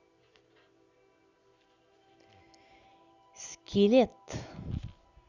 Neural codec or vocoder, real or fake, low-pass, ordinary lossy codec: none; real; 7.2 kHz; Opus, 64 kbps